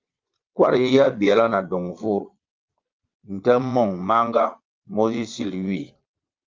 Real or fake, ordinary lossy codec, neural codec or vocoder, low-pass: fake; Opus, 32 kbps; vocoder, 22.05 kHz, 80 mel bands, Vocos; 7.2 kHz